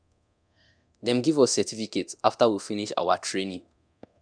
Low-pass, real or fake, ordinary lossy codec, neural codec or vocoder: 9.9 kHz; fake; none; codec, 24 kHz, 0.9 kbps, DualCodec